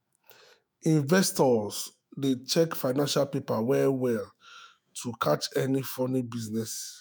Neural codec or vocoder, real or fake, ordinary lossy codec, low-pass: autoencoder, 48 kHz, 128 numbers a frame, DAC-VAE, trained on Japanese speech; fake; none; none